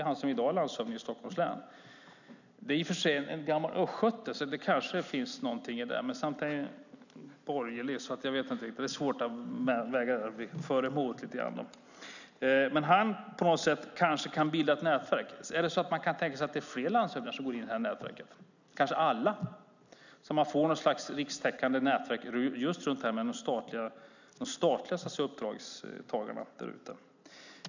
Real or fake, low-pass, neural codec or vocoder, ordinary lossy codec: real; 7.2 kHz; none; none